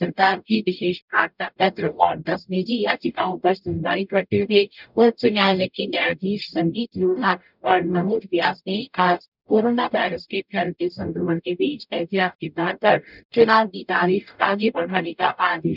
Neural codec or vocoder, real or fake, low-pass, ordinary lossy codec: codec, 44.1 kHz, 0.9 kbps, DAC; fake; 5.4 kHz; none